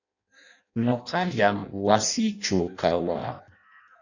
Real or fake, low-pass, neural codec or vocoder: fake; 7.2 kHz; codec, 16 kHz in and 24 kHz out, 0.6 kbps, FireRedTTS-2 codec